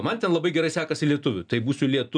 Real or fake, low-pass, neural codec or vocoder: real; 9.9 kHz; none